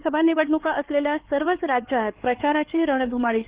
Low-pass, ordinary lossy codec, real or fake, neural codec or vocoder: 3.6 kHz; Opus, 16 kbps; fake; codec, 16 kHz, 4 kbps, X-Codec, HuBERT features, trained on LibriSpeech